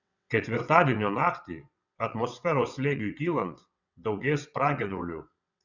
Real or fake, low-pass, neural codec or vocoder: fake; 7.2 kHz; vocoder, 22.05 kHz, 80 mel bands, WaveNeXt